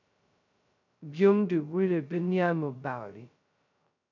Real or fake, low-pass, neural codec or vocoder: fake; 7.2 kHz; codec, 16 kHz, 0.2 kbps, FocalCodec